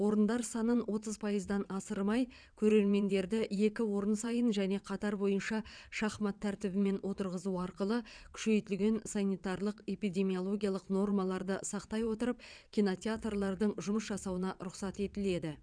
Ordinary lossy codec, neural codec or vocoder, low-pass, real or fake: none; vocoder, 22.05 kHz, 80 mel bands, WaveNeXt; 9.9 kHz; fake